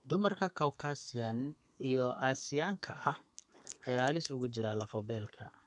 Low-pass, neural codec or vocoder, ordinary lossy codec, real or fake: 10.8 kHz; codec, 32 kHz, 1.9 kbps, SNAC; none; fake